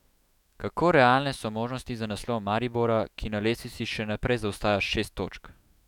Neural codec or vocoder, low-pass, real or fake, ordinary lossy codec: autoencoder, 48 kHz, 128 numbers a frame, DAC-VAE, trained on Japanese speech; 19.8 kHz; fake; none